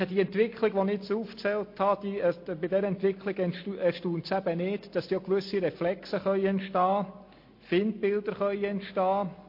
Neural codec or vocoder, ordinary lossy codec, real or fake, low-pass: none; MP3, 32 kbps; real; 5.4 kHz